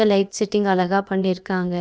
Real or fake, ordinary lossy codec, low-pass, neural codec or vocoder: fake; none; none; codec, 16 kHz, about 1 kbps, DyCAST, with the encoder's durations